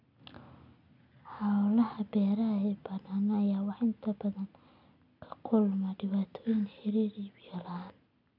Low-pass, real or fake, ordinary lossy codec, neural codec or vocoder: 5.4 kHz; real; none; none